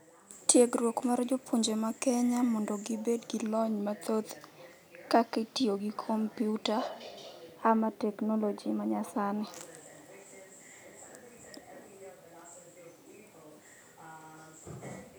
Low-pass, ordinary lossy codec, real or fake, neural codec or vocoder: none; none; real; none